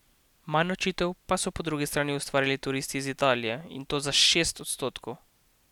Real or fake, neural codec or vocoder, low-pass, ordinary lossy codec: real; none; 19.8 kHz; none